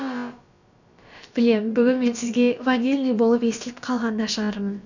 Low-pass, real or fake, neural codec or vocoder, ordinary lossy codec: 7.2 kHz; fake; codec, 16 kHz, about 1 kbps, DyCAST, with the encoder's durations; none